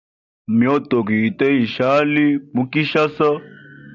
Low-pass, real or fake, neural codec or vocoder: 7.2 kHz; real; none